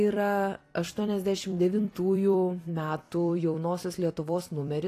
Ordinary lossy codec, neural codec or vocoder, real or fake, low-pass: AAC, 48 kbps; vocoder, 44.1 kHz, 128 mel bands every 256 samples, BigVGAN v2; fake; 14.4 kHz